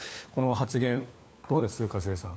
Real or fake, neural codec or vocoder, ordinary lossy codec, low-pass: fake; codec, 16 kHz, 4 kbps, FunCodec, trained on LibriTTS, 50 frames a second; none; none